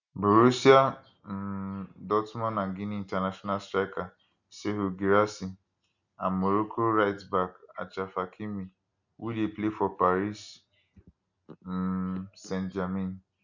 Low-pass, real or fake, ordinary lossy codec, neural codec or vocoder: 7.2 kHz; real; none; none